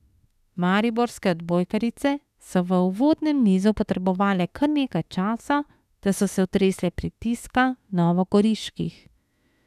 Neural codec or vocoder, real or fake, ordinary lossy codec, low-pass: autoencoder, 48 kHz, 32 numbers a frame, DAC-VAE, trained on Japanese speech; fake; none; 14.4 kHz